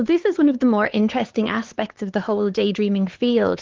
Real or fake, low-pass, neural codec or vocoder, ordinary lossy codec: fake; 7.2 kHz; codec, 16 kHz, 4 kbps, X-Codec, HuBERT features, trained on LibriSpeech; Opus, 16 kbps